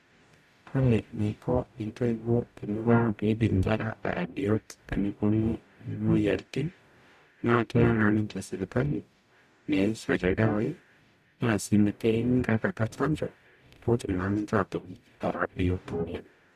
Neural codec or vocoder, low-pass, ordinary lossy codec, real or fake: codec, 44.1 kHz, 0.9 kbps, DAC; 14.4 kHz; none; fake